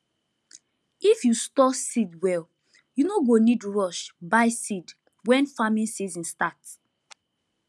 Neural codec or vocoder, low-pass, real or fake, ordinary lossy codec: none; none; real; none